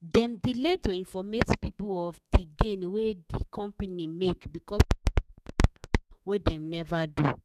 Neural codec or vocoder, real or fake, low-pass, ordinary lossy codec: codec, 32 kHz, 1.9 kbps, SNAC; fake; 14.4 kHz; none